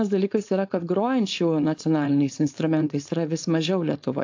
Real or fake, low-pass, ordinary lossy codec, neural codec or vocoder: fake; 7.2 kHz; AAC, 48 kbps; codec, 16 kHz, 4.8 kbps, FACodec